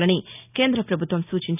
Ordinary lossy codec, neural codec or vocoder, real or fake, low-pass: none; none; real; 3.6 kHz